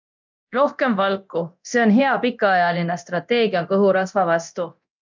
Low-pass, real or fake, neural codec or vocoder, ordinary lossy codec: 7.2 kHz; fake; codec, 24 kHz, 0.9 kbps, DualCodec; MP3, 64 kbps